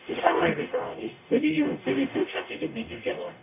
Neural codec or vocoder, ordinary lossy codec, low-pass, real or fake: codec, 44.1 kHz, 0.9 kbps, DAC; none; 3.6 kHz; fake